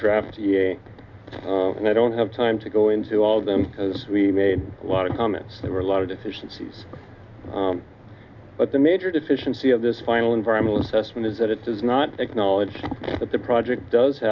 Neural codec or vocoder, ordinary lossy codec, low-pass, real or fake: none; MP3, 64 kbps; 7.2 kHz; real